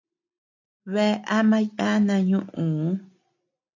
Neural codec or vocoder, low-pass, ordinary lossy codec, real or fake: none; 7.2 kHz; AAC, 48 kbps; real